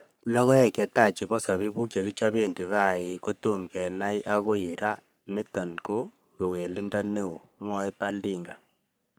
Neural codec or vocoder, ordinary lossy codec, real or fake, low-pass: codec, 44.1 kHz, 3.4 kbps, Pupu-Codec; none; fake; none